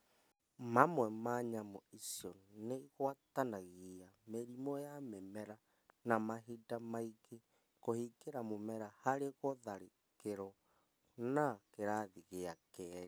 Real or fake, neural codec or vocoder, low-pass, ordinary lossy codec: real; none; none; none